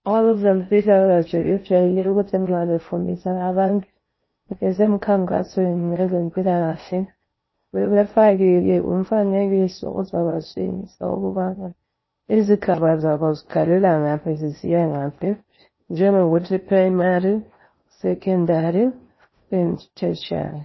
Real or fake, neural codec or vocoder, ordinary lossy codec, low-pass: fake; codec, 16 kHz in and 24 kHz out, 0.6 kbps, FocalCodec, streaming, 4096 codes; MP3, 24 kbps; 7.2 kHz